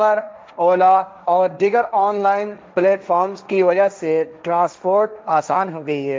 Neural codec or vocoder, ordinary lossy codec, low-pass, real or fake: codec, 16 kHz, 1.1 kbps, Voila-Tokenizer; none; none; fake